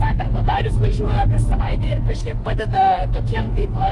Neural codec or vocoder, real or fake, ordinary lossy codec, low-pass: autoencoder, 48 kHz, 32 numbers a frame, DAC-VAE, trained on Japanese speech; fake; AAC, 48 kbps; 10.8 kHz